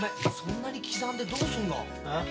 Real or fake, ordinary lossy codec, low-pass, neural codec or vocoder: real; none; none; none